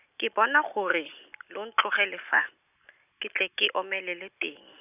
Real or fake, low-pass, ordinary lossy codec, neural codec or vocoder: real; 3.6 kHz; none; none